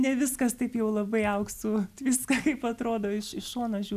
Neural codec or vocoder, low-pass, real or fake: none; 14.4 kHz; real